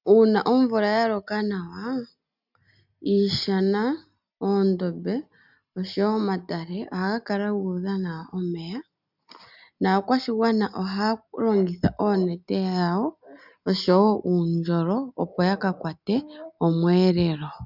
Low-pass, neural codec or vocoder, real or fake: 5.4 kHz; none; real